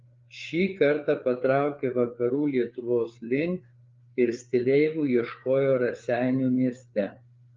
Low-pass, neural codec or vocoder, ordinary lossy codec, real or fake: 7.2 kHz; codec, 16 kHz, 4 kbps, FreqCodec, larger model; Opus, 32 kbps; fake